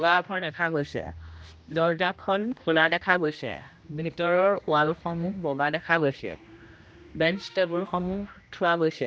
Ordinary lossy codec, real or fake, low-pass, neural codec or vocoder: none; fake; none; codec, 16 kHz, 1 kbps, X-Codec, HuBERT features, trained on general audio